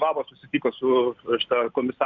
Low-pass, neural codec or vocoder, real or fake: 7.2 kHz; none; real